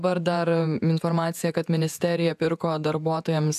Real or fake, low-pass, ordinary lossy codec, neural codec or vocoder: fake; 14.4 kHz; AAC, 96 kbps; vocoder, 48 kHz, 128 mel bands, Vocos